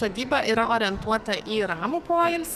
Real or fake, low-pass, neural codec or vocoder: fake; 14.4 kHz; codec, 44.1 kHz, 2.6 kbps, SNAC